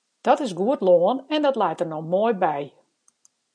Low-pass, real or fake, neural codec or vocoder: 9.9 kHz; real; none